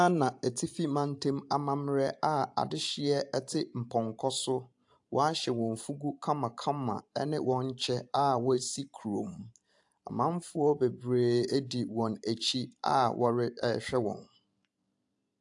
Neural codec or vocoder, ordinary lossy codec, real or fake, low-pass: vocoder, 44.1 kHz, 128 mel bands every 512 samples, BigVGAN v2; AAC, 64 kbps; fake; 10.8 kHz